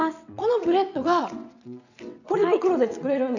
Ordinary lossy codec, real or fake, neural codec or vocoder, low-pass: none; fake; vocoder, 22.05 kHz, 80 mel bands, WaveNeXt; 7.2 kHz